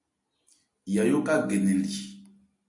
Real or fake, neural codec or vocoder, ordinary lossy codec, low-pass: real; none; MP3, 64 kbps; 10.8 kHz